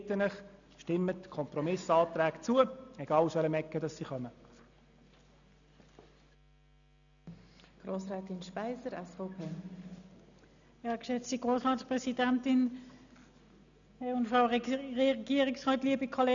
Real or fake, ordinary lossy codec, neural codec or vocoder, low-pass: real; none; none; 7.2 kHz